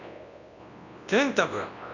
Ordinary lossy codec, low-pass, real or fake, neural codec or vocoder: none; 7.2 kHz; fake; codec, 24 kHz, 0.9 kbps, WavTokenizer, large speech release